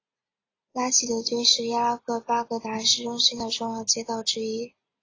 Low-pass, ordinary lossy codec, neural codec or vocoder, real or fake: 7.2 kHz; AAC, 32 kbps; none; real